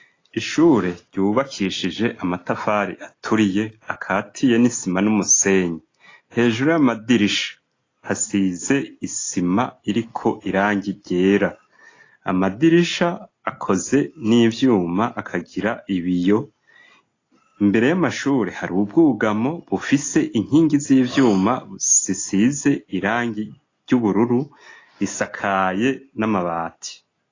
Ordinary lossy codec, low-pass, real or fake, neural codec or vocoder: AAC, 32 kbps; 7.2 kHz; real; none